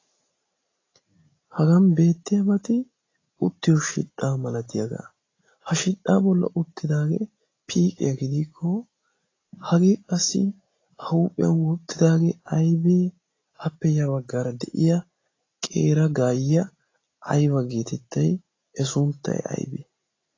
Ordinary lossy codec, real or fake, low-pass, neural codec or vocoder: AAC, 32 kbps; real; 7.2 kHz; none